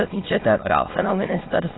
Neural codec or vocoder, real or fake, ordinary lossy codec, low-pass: autoencoder, 22.05 kHz, a latent of 192 numbers a frame, VITS, trained on many speakers; fake; AAC, 16 kbps; 7.2 kHz